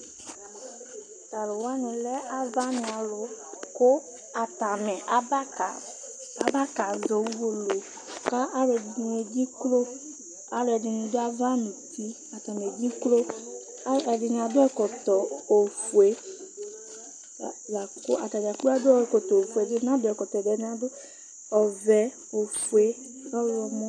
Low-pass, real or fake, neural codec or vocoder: 9.9 kHz; real; none